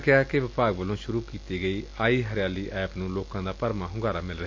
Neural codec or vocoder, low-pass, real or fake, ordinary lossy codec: none; 7.2 kHz; real; MP3, 48 kbps